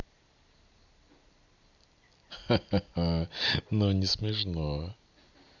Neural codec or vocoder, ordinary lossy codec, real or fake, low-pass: none; none; real; 7.2 kHz